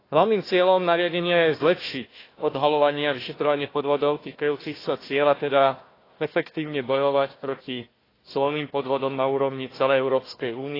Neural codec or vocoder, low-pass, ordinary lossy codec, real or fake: codec, 16 kHz, 1 kbps, FunCodec, trained on Chinese and English, 50 frames a second; 5.4 kHz; AAC, 24 kbps; fake